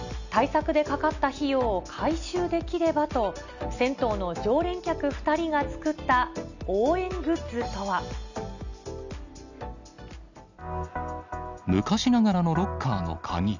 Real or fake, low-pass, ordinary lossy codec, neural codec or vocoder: real; 7.2 kHz; none; none